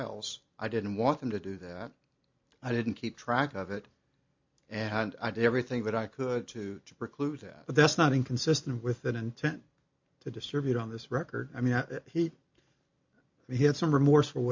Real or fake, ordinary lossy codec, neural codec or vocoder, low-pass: real; MP3, 48 kbps; none; 7.2 kHz